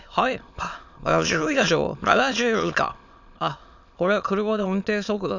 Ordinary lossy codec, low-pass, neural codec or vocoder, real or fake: none; 7.2 kHz; autoencoder, 22.05 kHz, a latent of 192 numbers a frame, VITS, trained on many speakers; fake